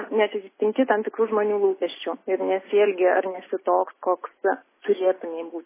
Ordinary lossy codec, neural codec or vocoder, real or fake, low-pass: MP3, 16 kbps; none; real; 3.6 kHz